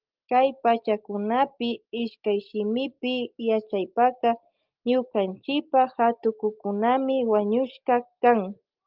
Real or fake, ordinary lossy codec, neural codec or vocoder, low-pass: real; Opus, 24 kbps; none; 5.4 kHz